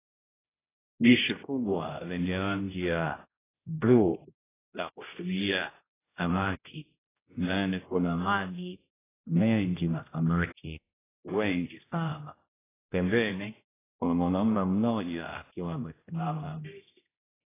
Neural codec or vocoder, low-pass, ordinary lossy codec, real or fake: codec, 16 kHz, 0.5 kbps, X-Codec, HuBERT features, trained on general audio; 3.6 kHz; AAC, 16 kbps; fake